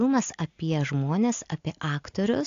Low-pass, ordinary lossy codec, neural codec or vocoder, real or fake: 7.2 kHz; AAC, 48 kbps; none; real